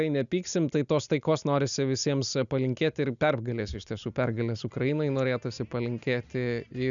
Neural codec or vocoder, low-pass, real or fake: none; 7.2 kHz; real